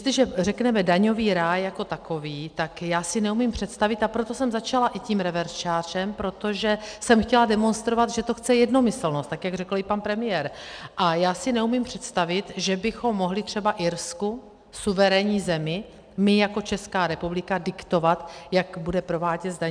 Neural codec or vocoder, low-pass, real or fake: none; 9.9 kHz; real